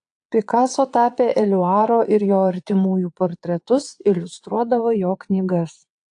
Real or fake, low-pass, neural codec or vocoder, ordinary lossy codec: fake; 9.9 kHz; vocoder, 22.05 kHz, 80 mel bands, Vocos; AAC, 64 kbps